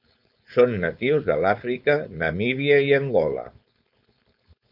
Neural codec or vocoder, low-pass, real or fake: codec, 16 kHz, 4.8 kbps, FACodec; 5.4 kHz; fake